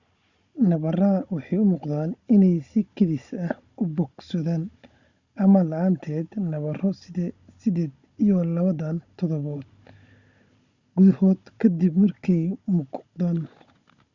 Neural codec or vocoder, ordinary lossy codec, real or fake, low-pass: codec, 16 kHz, 16 kbps, FunCodec, trained on Chinese and English, 50 frames a second; Opus, 64 kbps; fake; 7.2 kHz